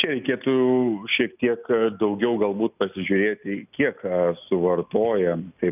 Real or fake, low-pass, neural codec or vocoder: real; 3.6 kHz; none